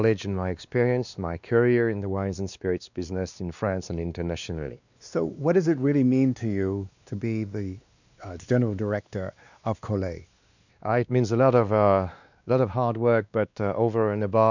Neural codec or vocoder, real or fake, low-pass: codec, 16 kHz, 2 kbps, X-Codec, WavLM features, trained on Multilingual LibriSpeech; fake; 7.2 kHz